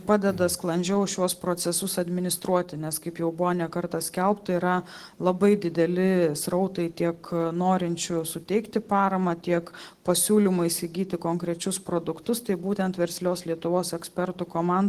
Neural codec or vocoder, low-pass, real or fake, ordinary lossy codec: none; 14.4 kHz; real; Opus, 16 kbps